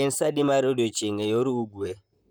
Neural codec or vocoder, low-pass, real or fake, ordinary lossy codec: vocoder, 44.1 kHz, 128 mel bands, Pupu-Vocoder; none; fake; none